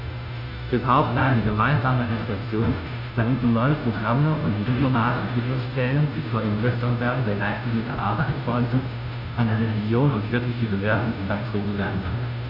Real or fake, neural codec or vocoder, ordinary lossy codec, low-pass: fake; codec, 16 kHz, 0.5 kbps, FunCodec, trained on Chinese and English, 25 frames a second; none; 5.4 kHz